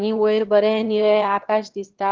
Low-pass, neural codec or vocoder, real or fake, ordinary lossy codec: 7.2 kHz; autoencoder, 22.05 kHz, a latent of 192 numbers a frame, VITS, trained on one speaker; fake; Opus, 16 kbps